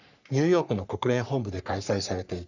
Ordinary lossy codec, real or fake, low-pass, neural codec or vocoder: none; fake; 7.2 kHz; codec, 44.1 kHz, 3.4 kbps, Pupu-Codec